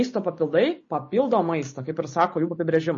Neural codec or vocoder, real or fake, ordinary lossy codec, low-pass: none; real; MP3, 32 kbps; 7.2 kHz